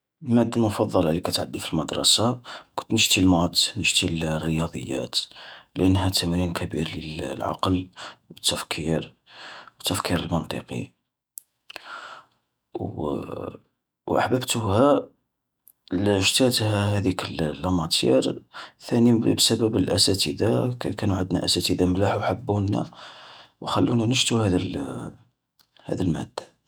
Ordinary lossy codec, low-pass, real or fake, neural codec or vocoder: none; none; fake; autoencoder, 48 kHz, 128 numbers a frame, DAC-VAE, trained on Japanese speech